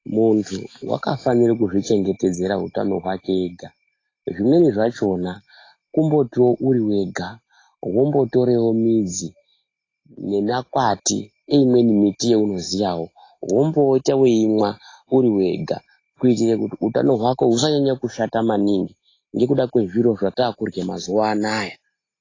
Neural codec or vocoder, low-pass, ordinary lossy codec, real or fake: none; 7.2 kHz; AAC, 32 kbps; real